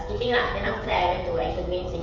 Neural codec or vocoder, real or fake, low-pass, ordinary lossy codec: codec, 16 kHz, 16 kbps, FreqCodec, smaller model; fake; 7.2 kHz; MP3, 48 kbps